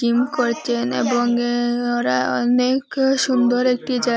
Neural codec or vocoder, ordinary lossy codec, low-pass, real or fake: none; none; none; real